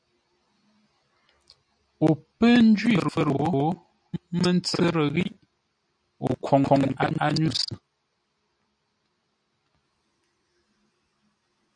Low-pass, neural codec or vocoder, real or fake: 9.9 kHz; none; real